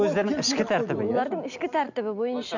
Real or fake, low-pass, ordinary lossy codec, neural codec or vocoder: real; 7.2 kHz; none; none